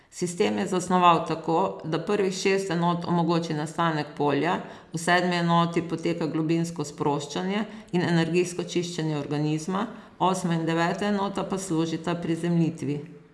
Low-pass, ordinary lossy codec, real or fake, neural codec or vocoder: none; none; real; none